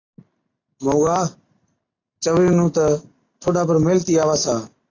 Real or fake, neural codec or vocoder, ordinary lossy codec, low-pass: real; none; AAC, 32 kbps; 7.2 kHz